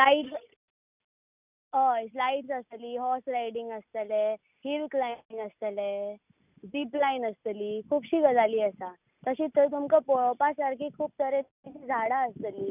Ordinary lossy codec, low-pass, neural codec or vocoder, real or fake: none; 3.6 kHz; none; real